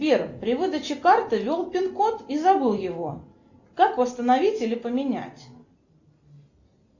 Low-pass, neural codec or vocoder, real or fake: 7.2 kHz; none; real